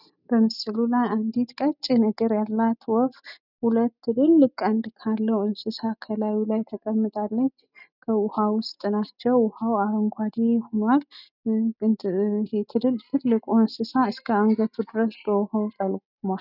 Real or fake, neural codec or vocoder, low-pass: real; none; 5.4 kHz